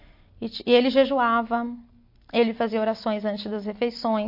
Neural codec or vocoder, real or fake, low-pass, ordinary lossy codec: none; real; 5.4 kHz; MP3, 32 kbps